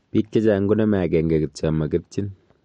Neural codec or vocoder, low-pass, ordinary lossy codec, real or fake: none; 14.4 kHz; MP3, 48 kbps; real